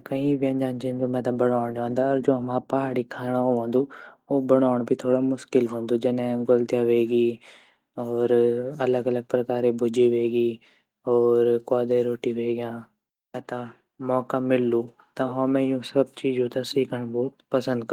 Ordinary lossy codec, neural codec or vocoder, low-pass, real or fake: Opus, 24 kbps; none; 19.8 kHz; real